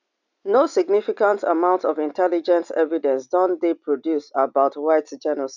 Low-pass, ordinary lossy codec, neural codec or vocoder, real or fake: 7.2 kHz; none; none; real